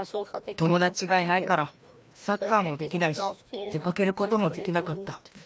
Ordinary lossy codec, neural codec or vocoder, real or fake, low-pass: none; codec, 16 kHz, 1 kbps, FreqCodec, larger model; fake; none